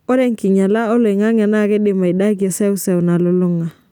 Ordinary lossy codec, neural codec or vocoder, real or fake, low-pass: none; none; real; 19.8 kHz